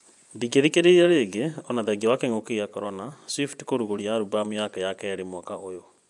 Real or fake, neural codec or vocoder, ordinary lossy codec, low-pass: fake; vocoder, 24 kHz, 100 mel bands, Vocos; none; 10.8 kHz